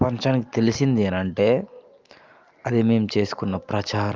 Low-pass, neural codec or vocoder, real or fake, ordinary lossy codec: 7.2 kHz; none; real; Opus, 24 kbps